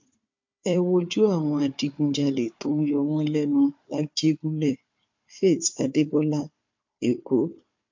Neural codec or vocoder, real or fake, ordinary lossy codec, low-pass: codec, 16 kHz, 4 kbps, FunCodec, trained on Chinese and English, 50 frames a second; fake; MP3, 48 kbps; 7.2 kHz